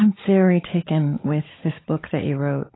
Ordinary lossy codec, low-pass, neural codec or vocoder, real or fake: AAC, 16 kbps; 7.2 kHz; codec, 16 kHz, 16 kbps, FunCodec, trained on LibriTTS, 50 frames a second; fake